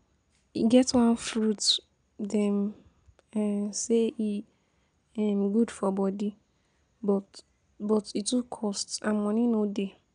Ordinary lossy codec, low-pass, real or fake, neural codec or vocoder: none; 9.9 kHz; real; none